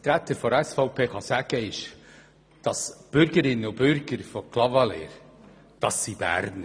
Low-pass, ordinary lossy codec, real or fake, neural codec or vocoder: none; none; real; none